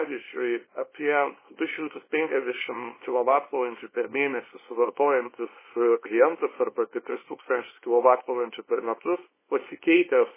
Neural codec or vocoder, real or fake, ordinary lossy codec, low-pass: codec, 24 kHz, 0.9 kbps, WavTokenizer, small release; fake; MP3, 16 kbps; 3.6 kHz